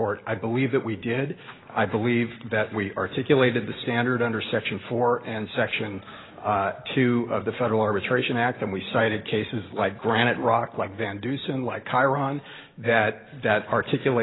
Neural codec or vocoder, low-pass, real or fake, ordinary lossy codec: none; 7.2 kHz; real; AAC, 16 kbps